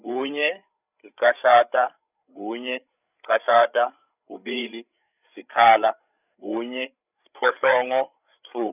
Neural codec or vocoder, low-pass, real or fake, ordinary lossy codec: codec, 16 kHz, 8 kbps, FreqCodec, larger model; 3.6 kHz; fake; none